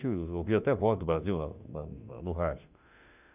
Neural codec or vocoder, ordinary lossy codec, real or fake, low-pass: autoencoder, 48 kHz, 32 numbers a frame, DAC-VAE, trained on Japanese speech; none; fake; 3.6 kHz